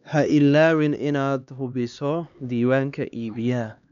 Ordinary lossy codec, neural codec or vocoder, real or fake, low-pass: none; codec, 16 kHz, 2 kbps, X-Codec, HuBERT features, trained on LibriSpeech; fake; 7.2 kHz